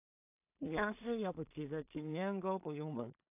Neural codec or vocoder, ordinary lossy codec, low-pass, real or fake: codec, 16 kHz in and 24 kHz out, 0.4 kbps, LongCat-Audio-Codec, two codebook decoder; AAC, 32 kbps; 3.6 kHz; fake